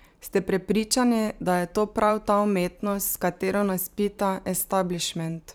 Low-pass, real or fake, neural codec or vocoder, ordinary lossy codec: none; fake; vocoder, 44.1 kHz, 128 mel bands every 512 samples, BigVGAN v2; none